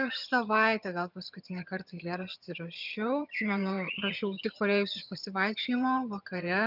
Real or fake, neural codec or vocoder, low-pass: fake; vocoder, 22.05 kHz, 80 mel bands, HiFi-GAN; 5.4 kHz